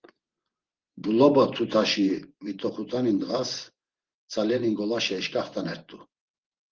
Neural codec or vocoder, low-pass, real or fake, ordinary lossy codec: vocoder, 24 kHz, 100 mel bands, Vocos; 7.2 kHz; fake; Opus, 16 kbps